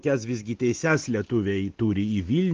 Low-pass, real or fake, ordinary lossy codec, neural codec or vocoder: 7.2 kHz; real; Opus, 16 kbps; none